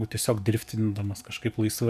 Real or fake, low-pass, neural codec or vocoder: fake; 14.4 kHz; codec, 44.1 kHz, 7.8 kbps, DAC